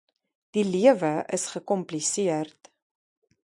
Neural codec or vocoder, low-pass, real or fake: none; 10.8 kHz; real